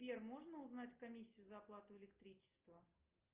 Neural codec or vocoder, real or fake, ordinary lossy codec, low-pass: none; real; Opus, 24 kbps; 3.6 kHz